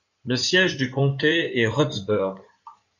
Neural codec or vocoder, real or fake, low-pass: codec, 16 kHz in and 24 kHz out, 2.2 kbps, FireRedTTS-2 codec; fake; 7.2 kHz